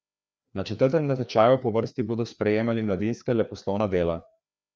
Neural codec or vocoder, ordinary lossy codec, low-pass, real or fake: codec, 16 kHz, 2 kbps, FreqCodec, larger model; none; none; fake